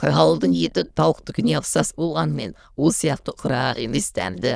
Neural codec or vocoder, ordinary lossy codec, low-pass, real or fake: autoencoder, 22.05 kHz, a latent of 192 numbers a frame, VITS, trained on many speakers; none; none; fake